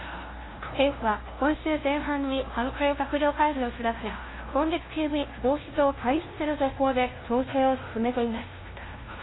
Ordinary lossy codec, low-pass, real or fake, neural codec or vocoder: AAC, 16 kbps; 7.2 kHz; fake; codec, 16 kHz, 0.5 kbps, FunCodec, trained on LibriTTS, 25 frames a second